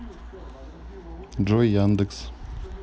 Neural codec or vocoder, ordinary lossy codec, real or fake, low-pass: none; none; real; none